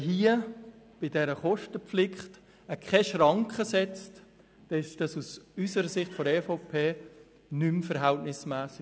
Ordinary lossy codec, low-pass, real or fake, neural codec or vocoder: none; none; real; none